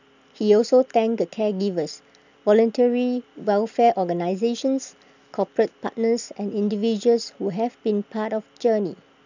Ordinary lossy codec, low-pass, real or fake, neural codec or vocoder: none; 7.2 kHz; real; none